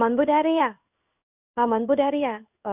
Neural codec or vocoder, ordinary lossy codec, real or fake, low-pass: codec, 16 kHz in and 24 kHz out, 1 kbps, XY-Tokenizer; none; fake; 3.6 kHz